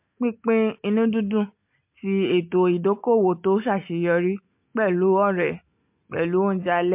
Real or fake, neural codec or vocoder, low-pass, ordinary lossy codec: real; none; 3.6 kHz; MP3, 32 kbps